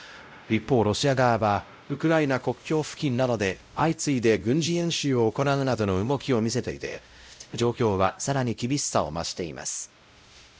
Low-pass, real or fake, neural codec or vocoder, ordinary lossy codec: none; fake; codec, 16 kHz, 0.5 kbps, X-Codec, WavLM features, trained on Multilingual LibriSpeech; none